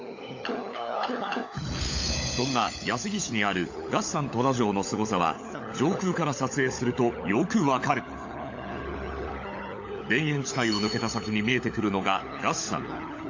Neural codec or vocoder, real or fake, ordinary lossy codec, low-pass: codec, 16 kHz, 16 kbps, FunCodec, trained on LibriTTS, 50 frames a second; fake; AAC, 48 kbps; 7.2 kHz